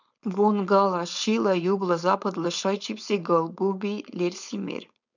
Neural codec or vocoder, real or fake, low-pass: codec, 16 kHz, 4.8 kbps, FACodec; fake; 7.2 kHz